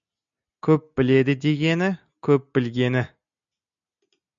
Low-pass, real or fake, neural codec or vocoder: 7.2 kHz; real; none